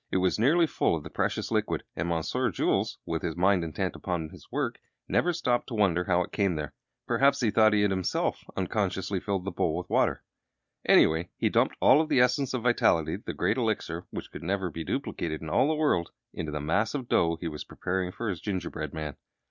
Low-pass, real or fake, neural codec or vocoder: 7.2 kHz; real; none